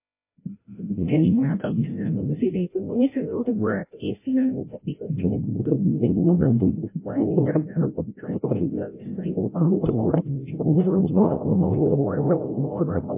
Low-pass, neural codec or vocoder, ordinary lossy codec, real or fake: 3.6 kHz; codec, 16 kHz, 0.5 kbps, FreqCodec, larger model; MP3, 24 kbps; fake